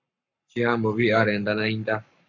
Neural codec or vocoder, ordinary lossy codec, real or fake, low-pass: codec, 44.1 kHz, 7.8 kbps, Pupu-Codec; MP3, 64 kbps; fake; 7.2 kHz